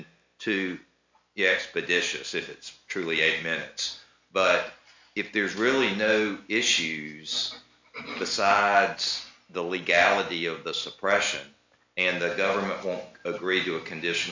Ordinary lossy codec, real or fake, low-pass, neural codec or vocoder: MP3, 64 kbps; real; 7.2 kHz; none